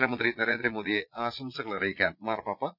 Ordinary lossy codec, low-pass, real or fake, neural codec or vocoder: none; 5.4 kHz; fake; vocoder, 22.05 kHz, 80 mel bands, Vocos